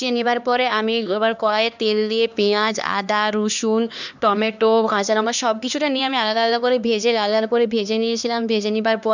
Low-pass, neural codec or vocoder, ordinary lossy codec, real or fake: 7.2 kHz; codec, 16 kHz, 2 kbps, X-Codec, HuBERT features, trained on LibriSpeech; none; fake